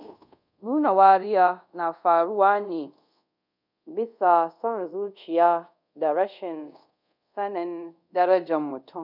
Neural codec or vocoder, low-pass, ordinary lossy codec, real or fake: codec, 24 kHz, 0.5 kbps, DualCodec; 5.4 kHz; none; fake